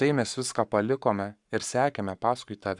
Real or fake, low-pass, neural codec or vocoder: fake; 10.8 kHz; codec, 44.1 kHz, 7.8 kbps, Pupu-Codec